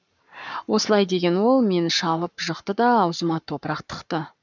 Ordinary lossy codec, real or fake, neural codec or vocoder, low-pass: none; fake; codec, 44.1 kHz, 7.8 kbps, Pupu-Codec; 7.2 kHz